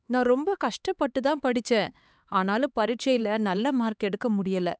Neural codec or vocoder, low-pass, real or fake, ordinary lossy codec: codec, 16 kHz, 4 kbps, X-Codec, HuBERT features, trained on LibriSpeech; none; fake; none